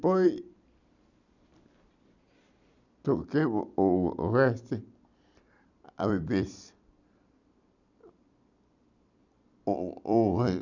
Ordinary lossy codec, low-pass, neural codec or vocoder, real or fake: none; 7.2 kHz; vocoder, 44.1 kHz, 128 mel bands every 256 samples, BigVGAN v2; fake